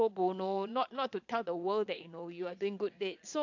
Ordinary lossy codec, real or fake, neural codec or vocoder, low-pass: AAC, 48 kbps; fake; vocoder, 22.05 kHz, 80 mel bands, Vocos; 7.2 kHz